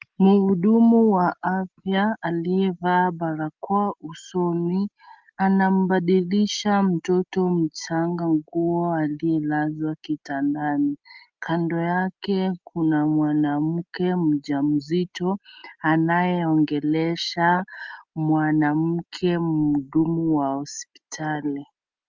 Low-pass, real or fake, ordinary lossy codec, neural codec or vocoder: 7.2 kHz; real; Opus, 32 kbps; none